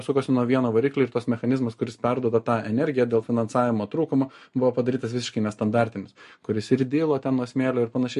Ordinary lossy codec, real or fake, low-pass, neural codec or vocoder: MP3, 48 kbps; real; 14.4 kHz; none